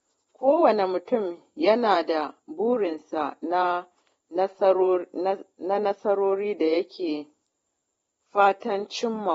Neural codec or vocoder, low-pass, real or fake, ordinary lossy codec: vocoder, 44.1 kHz, 128 mel bands every 512 samples, BigVGAN v2; 19.8 kHz; fake; AAC, 24 kbps